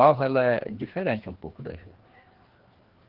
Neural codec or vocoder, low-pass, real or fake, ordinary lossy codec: codec, 16 kHz, 2 kbps, FreqCodec, larger model; 5.4 kHz; fake; Opus, 16 kbps